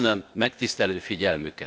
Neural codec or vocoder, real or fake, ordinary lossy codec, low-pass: codec, 16 kHz, 0.8 kbps, ZipCodec; fake; none; none